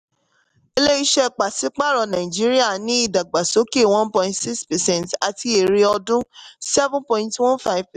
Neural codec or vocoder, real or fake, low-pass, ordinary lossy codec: none; real; 14.4 kHz; none